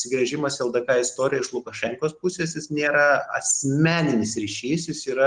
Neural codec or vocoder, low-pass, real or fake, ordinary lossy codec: none; 9.9 kHz; real; Opus, 24 kbps